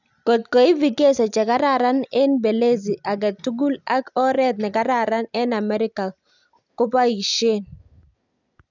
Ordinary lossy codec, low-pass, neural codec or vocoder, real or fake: none; 7.2 kHz; none; real